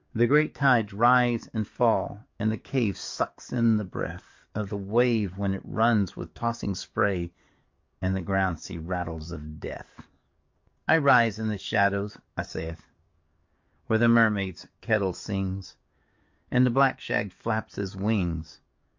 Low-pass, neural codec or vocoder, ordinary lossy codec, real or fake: 7.2 kHz; codec, 44.1 kHz, 7.8 kbps, DAC; MP3, 48 kbps; fake